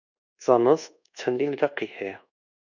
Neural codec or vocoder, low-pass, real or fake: codec, 24 kHz, 1.2 kbps, DualCodec; 7.2 kHz; fake